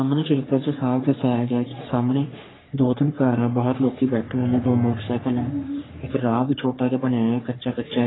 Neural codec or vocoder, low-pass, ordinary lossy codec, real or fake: codec, 44.1 kHz, 3.4 kbps, Pupu-Codec; 7.2 kHz; AAC, 16 kbps; fake